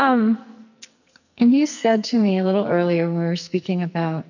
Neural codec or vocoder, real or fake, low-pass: codec, 44.1 kHz, 2.6 kbps, SNAC; fake; 7.2 kHz